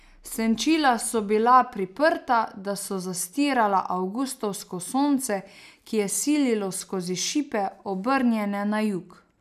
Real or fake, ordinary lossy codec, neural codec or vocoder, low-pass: real; none; none; 14.4 kHz